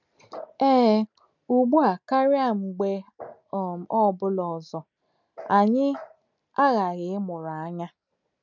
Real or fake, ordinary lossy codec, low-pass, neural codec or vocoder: real; none; 7.2 kHz; none